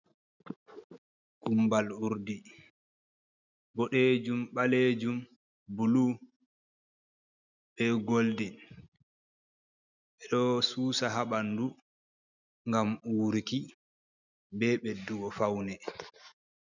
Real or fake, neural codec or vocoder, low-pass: real; none; 7.2 kHz